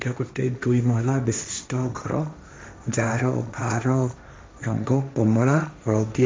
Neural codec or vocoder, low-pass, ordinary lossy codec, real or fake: codec, 16 kHz, 1.1 kbps, Voila-Tokenizer; none; none; fake